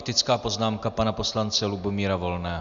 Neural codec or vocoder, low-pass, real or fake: none; 7.2 kHz; real